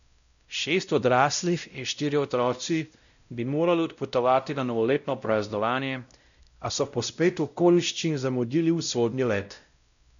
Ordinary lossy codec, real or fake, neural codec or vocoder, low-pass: none; fake; codec, 16 kHz, 0.5 kbps, X-Codec, WavLM features, trained on Multilingual LibriSpeech; 7.2 kHz